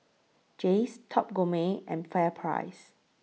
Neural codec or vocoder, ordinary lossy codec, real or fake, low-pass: none; none; real; none